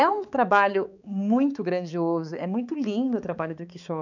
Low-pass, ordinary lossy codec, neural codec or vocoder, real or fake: 7.2 kHz; none; codec, 16 kHz, 4 kbps, X-Codec, HuBERT features, trained on balanced general audio; fake